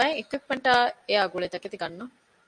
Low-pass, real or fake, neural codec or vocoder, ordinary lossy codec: 9.9 kHz; real; none; MP3, 64 kbps